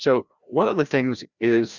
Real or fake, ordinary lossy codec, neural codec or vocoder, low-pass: fake; Opus, 64 kbps; codec, 16 kHz, 1 kbps, FreqCodec, larger model; 7.2 kHz